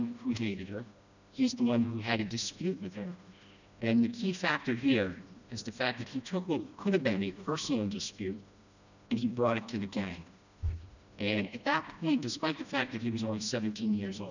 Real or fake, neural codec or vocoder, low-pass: fake; codec, 16 kHz, 1 kbps, FreqCodec, smaller model; 7.2 kHz